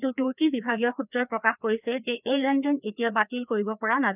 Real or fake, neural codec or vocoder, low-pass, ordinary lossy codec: fake; codec, 16 kHz, 2 kbps, FreqCodec, larger model; 3.6 kHz; none